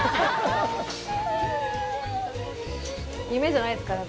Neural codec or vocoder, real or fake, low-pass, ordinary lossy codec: none; real; none; none